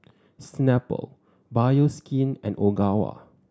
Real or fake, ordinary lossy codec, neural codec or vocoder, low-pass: real; none; none; none